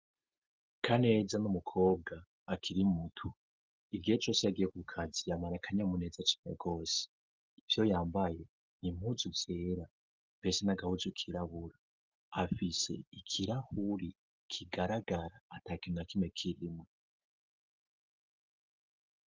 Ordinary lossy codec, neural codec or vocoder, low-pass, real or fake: Opus, 32 kbps; none; 7.2 kHz; real